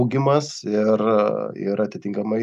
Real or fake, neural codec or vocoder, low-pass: real; none; 14.4 kHz